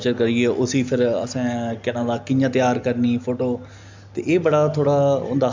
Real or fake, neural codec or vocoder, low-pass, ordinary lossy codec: real; none; 7.2 kHz; MP3, 64 kbps